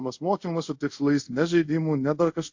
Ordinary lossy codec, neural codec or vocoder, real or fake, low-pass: AAC, 48 kbps; codec, 24 kHz, 0.5 kbps, DualCodec; fake; 7.2 kHz